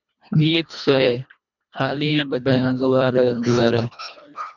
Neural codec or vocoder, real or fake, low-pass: codec, 24 kHz, 1.5 kbps, HILCodec; fake; 7.2 kHz